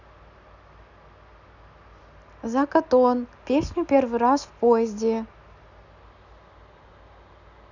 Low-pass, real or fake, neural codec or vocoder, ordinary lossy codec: 7.2 kHz; real; none; none